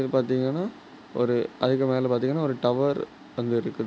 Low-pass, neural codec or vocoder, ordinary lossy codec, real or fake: none; none; none; real